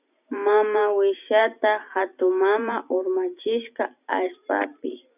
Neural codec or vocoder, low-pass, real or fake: vocoder, 44.1 kHz, 128 mel bands every 512 samples, BigVGAN v2; 3.6 kHz; fake